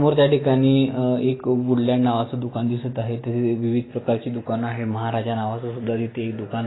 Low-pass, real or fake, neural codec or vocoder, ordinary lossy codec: 7.2 kHz; real; none; AAC, 16 kbps